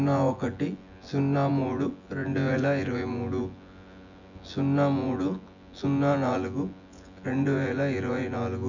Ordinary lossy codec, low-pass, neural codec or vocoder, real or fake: none; 7.2 kHz; vocoder, 24 kHz, 100 mel bands, Vocos; fake